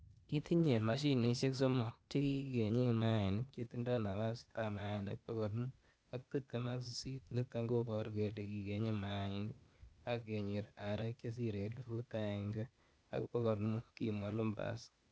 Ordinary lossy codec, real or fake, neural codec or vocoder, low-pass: none; fake; codec, 16 kHz, 0.8 kbps, ZipCodec; none